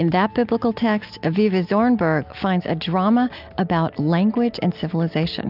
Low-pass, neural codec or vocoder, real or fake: 5.4 kHz; none; real